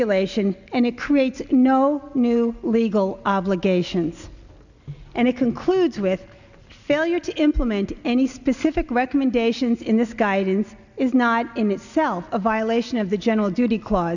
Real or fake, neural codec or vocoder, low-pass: real; none; 7.2 kHz